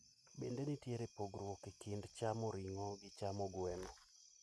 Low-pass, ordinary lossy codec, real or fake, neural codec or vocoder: none; none; real; none